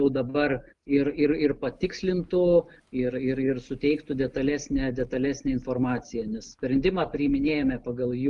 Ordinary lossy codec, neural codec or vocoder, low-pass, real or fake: Opus, 32 kbps; none; 10.8 kHz; real